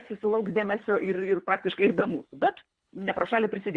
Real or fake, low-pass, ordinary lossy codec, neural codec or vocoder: fake; 9.9 kHz; Opus, 64 kbps; codec, 24 kHz, 3 kbps, HILCodec